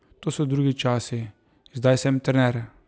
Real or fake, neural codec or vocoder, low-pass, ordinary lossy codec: real; none; none; none